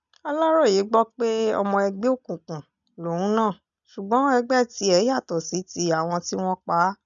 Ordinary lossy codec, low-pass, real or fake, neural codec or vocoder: none; 7.2 kHz; real; none